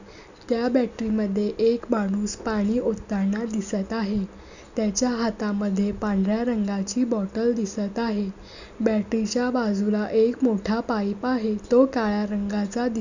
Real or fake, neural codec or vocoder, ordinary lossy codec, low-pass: real; none; none; 7.2 kHz